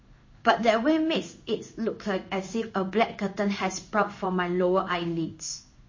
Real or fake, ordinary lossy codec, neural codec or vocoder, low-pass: fake; MP3, 32 kbps; codec, 16 kHz in and 24 kHz out, 1 kbps, XY-Tokenizer; 7.2 kHz